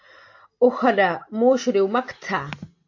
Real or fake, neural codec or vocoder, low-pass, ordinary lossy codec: real; none; 7.2 kHz; AAC, 48 kbps